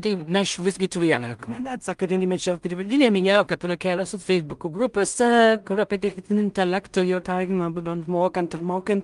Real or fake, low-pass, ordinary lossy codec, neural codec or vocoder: fake; 10.8 kHz; Opus, 32 kbps; codec, 16 kHz in and 24 kHz out, 0.4 kbps, LongCat-Audio-Codec, two codebook decoder